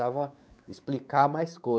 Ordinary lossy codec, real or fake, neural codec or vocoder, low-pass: none; fake; codec, 16 kHz, 4 kbps, X-Codec, WavLM features, trained on Multilingual LibriSpeech; none